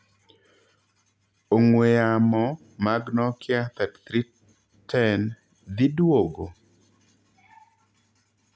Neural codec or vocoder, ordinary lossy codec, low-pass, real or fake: none; none; none; real